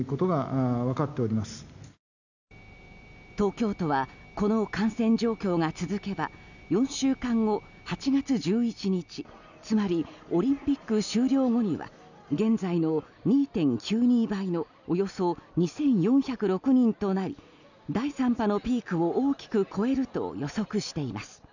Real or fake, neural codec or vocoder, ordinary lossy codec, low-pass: real; none; none; 7.2 kHz